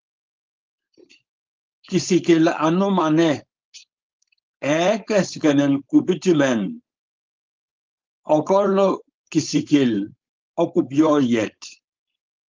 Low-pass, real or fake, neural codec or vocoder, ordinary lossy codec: 7.2 kHz; fake; codec, 16 kHz, 4.8 kbps, FACodec; Opus, 24 kbps